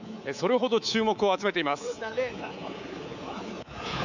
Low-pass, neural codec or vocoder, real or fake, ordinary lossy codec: 7.2 kHz; codec, 24 kHz, 3.1 kbps, DualCodec; fake; none